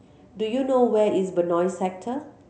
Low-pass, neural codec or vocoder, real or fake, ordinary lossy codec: none; none; real; none